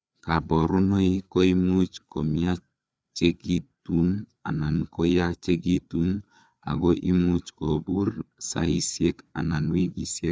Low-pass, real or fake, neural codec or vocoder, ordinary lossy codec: none; fake; codec, 16 kHz, 4 kbps, FreqCodec, larger model; none